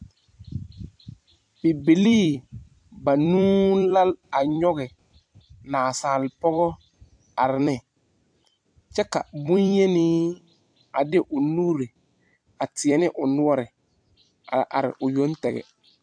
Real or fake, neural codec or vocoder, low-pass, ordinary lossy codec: fake; vocoder, 44.1 kHz, 128 mel bands every 256 samples, BigVGAN v2; 9.9 kHz; AAC, 64 kbps